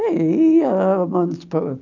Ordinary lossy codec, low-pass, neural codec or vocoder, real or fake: none; 7.2 kHz; none; real